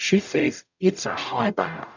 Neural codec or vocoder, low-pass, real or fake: codec, 44.1 kHz, 0.9 kbps, DAC; 7.2 kHz; fake